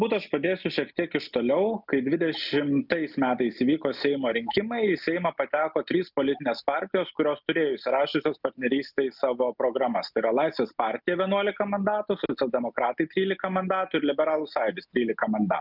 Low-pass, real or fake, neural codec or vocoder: 5.4 kHz; real; none